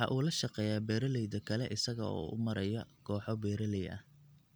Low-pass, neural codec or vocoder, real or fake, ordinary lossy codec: none; none; real; none